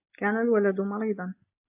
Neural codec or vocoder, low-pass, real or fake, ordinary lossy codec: none; 3.6 kHz; real; AAC, 32 kbps